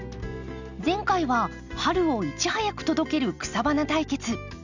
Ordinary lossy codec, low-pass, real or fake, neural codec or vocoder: none; 7.2 kHz; real; none